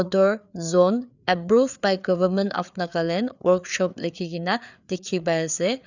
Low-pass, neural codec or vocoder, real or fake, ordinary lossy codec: 7.2 kHz; codec, 16 kHz, 4 kbps, FreqCodec, larger model; fake; none